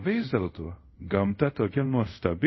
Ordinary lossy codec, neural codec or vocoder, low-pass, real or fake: MP3, 24 kbps; codec, 24 kHz, 0.5 kbps, DualCodec; 7.2 kHz; fake